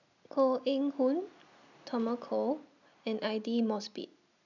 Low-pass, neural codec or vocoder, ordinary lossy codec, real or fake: 7.2 kHz; none; none; real